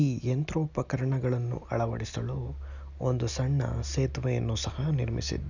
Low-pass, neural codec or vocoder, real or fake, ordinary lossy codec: 7.2 kHz; none; real; none